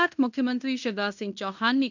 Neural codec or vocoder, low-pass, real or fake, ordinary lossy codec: codec, 16 kHz in and 24 kHz out, 0.9 kbps, LongCat-Audio-Codec, fine tuned four codebook decoder; 7.2 kHz; fake; none